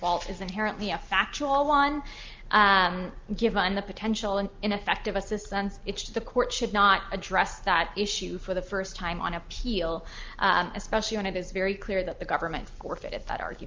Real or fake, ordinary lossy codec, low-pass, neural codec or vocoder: real; Opus, 24 kbps; 7.2 kHz; none